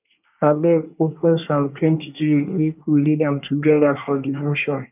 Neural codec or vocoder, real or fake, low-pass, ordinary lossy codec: codec, 24 kHz, 1 kbps, SNAC; fake; 3.6 kHz; none